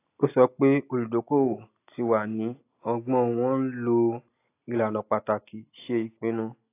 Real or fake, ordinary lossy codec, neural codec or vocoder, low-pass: real; AAC, 24 kbps; none; 3.6 kHz